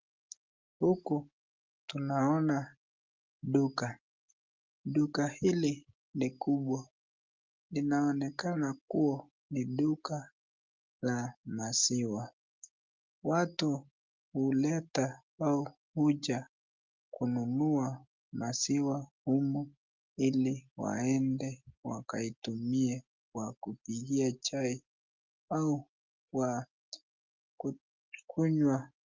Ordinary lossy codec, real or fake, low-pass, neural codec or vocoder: Opus, 32 kbps; real; 7.2 kHz; none